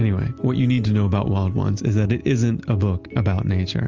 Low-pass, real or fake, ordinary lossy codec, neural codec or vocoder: 7.2 kHz; real; Opus, 24 kbps; none